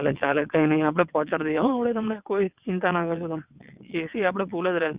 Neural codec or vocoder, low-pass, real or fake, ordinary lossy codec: vocoder, 22.05 kHz, 80 mel bands, WaveNeXt; 3.6 kHz; fake; Opus, 64 kbps